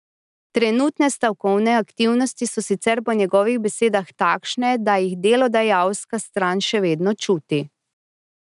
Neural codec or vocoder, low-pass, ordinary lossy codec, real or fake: none; 10.8 kHz; none; real